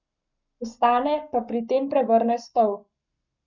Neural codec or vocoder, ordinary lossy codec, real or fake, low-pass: codec, 44.1 kHz, 7.8 kbps, Pupu-Codec; none; fake; 7.2 kHz